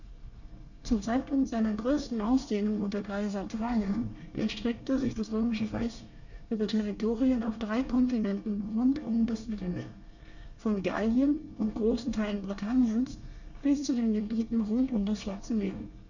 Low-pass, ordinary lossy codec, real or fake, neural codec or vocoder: 7.2 kHz; none; fake; codec, 24 kHz, 1 kbps, SNAC